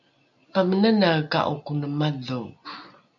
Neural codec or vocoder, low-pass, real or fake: none; 7.2 kHz; real